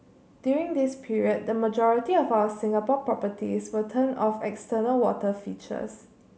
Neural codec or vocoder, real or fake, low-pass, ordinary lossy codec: none; real; none; none